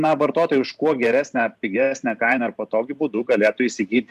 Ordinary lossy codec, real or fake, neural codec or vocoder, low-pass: AAC, 96 kbps; fake; vocoder, 44.1 kHz, 128 mel bands every 512 samples, BigVGAN v2; 14.4 kHz